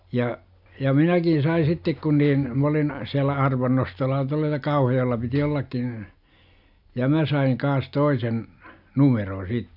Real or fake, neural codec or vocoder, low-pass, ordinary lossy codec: real; none; 5.4 kHz; none